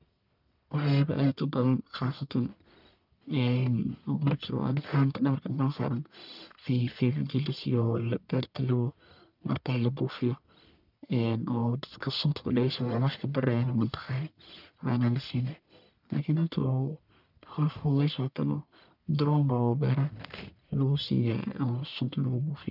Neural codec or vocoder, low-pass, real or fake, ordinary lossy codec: codec, 44.1 kHz, 1.7 kbps, Pupu-Codec; 5.4 kHz; fake; none